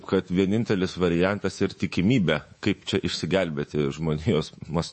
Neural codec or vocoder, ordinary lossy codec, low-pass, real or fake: codec, 24 kHz, 3.1 kbps, DualCodec; MP3, 32 kbps; 10.8 kHz; fake